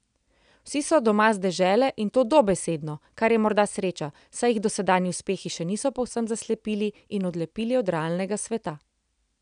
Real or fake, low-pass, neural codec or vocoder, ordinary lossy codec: real; 9.9 kHz; none; none